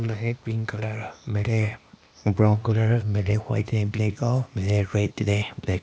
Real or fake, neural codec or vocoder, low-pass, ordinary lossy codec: fake; codec, 16 kHz, 0.8 kbps, ZipCodec; none; none